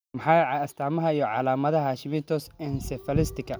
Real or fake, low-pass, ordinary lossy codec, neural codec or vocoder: real; none; none; none